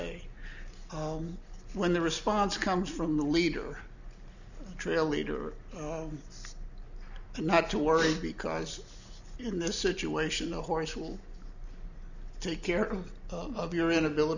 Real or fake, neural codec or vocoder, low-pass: real; none; 7.2 kHz